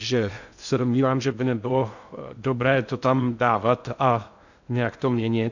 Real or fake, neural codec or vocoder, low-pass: fake; codec, 16 kHz in and 24 kHz out, 0.6 kbps, FocalCodec, streaming, 2048 codes; 7.2 kHz